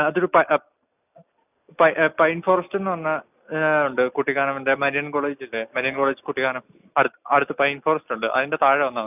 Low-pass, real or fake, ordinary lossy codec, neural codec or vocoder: 3.6 kHz; real; none; none